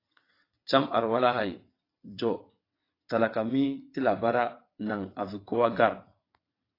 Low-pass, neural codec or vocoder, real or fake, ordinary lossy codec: 5.4 kHz; vocoder, 22.05 kHz, 80 mel bands, WaveNeXt; fake; AAC, 32 kbps